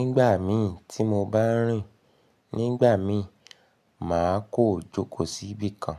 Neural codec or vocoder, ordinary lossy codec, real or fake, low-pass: none; none; real; 14.4 kHz